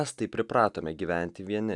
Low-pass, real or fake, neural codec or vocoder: 10.8 kHz; real; none